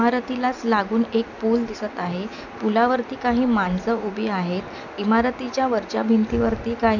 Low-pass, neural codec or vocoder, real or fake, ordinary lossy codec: 7.2 kHz; none; real; none